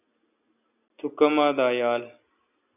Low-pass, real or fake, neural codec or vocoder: 3.6 kHz; real; none